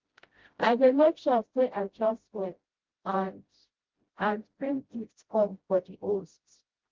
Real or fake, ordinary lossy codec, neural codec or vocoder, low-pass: fake; Opus, 16 kbps; codec, 16 kHz, 0.5 kbps, FreqCodec, smaller model; 7.2 kHz